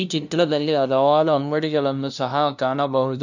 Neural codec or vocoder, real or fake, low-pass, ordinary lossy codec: codec, 16 kHz, 0.5 kbps, FunCodec, trained on LibriTTS, 25 frames a second; fake; 7.2 kHz; none